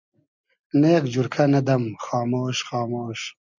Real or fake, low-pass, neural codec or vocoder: real; 7.2 kHz; none